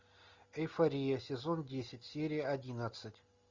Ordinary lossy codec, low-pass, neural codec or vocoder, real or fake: Opus, 64 kbps; 7.2 kHz; none; real